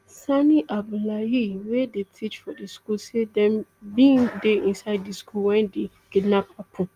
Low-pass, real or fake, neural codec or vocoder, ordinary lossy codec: 14.4 kHz; real; none; Opus, 32 kbps